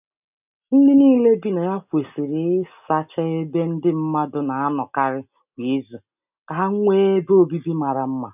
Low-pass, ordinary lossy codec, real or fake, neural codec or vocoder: 3.6 kHz; none; real; none